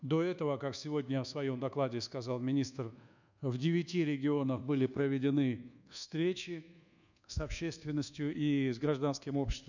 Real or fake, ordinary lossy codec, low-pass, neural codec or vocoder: fake; none; 7.2 kHz; codec, 24 kHz, 1.2 kbps, DualCodec